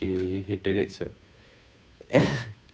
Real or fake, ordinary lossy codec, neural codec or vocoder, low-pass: fake; none; codec, 16 kHz, 2 kbps, FunCodec, trained on Chinese and English, 25 frames a second; none